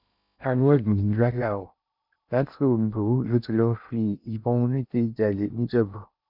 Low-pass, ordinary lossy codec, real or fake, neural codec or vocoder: 5.4 kHz; none; fake; codec, 16 kHz in and 24 kHz out, 0.6 kbps, FocalCodec, streaming, 2048 codes